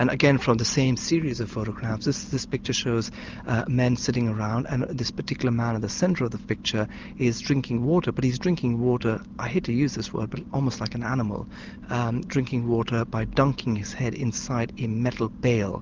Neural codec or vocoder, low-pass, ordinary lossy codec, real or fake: none; 7.2 kHz; Opus, 32 kbps; real